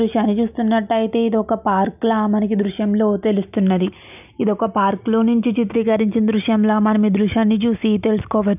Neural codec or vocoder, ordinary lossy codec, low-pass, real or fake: none; none; 3.6 kHz; real